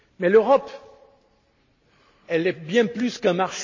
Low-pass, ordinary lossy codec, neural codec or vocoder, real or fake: 7.2 kHz; MP3, 32 kbps; none; real